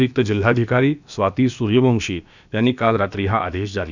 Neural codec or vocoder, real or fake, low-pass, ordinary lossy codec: codec, 16 kHz, about 1 kbps, DyCAST, with the encoder's durations; fake; 7.2 kHz; none